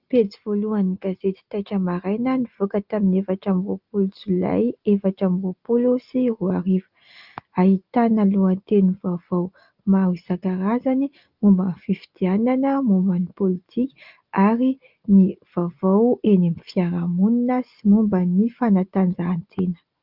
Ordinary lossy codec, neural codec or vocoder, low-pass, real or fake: Opus, 24 kbps; none; 5.4 kHz; real